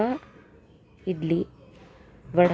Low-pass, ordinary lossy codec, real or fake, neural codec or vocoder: none; none; real; none